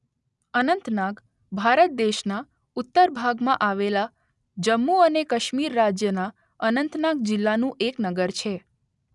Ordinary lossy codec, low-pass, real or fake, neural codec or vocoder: none; 10.8 kHz; real; none